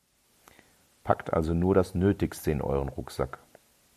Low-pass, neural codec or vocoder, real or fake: 14.4 kHz; none; real